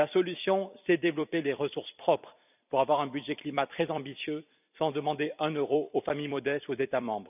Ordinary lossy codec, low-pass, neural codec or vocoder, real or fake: none; 3.6 kHz; vocoder, 44.1 kHz, 128 mel bands every 256 samples, BigVGAN v2; fake